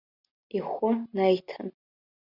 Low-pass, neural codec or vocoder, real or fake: 5.4 kHz; none; real